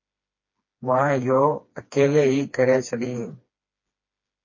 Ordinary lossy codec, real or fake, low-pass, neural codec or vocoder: MP3, 32 kbps; fake; 7.2 kHz; codec, 16 kHz, 2 kbps, FreqCodec, smaller model